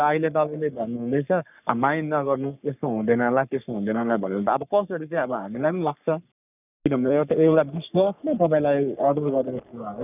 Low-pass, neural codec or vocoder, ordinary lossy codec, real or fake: 3.6 kHz; codec, 44.1 kHz, 3.4 kbps, Pupu-Codec; none; fake